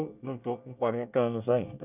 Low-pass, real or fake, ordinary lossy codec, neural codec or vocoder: 3.6 kHz; fake; none; codec, 24 kHz, 1 kbps, SNAC